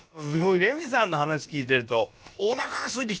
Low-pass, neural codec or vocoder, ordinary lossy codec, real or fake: none; codec, 16 kHz, about 1 kbps, DyCAST, with the encoder's durations; none; fake